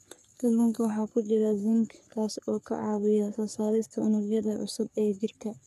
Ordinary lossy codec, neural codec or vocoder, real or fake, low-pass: none; codec, 44.1 kHz, 3.4 kbps, Pupu-Codec; fake; 14.4 kHz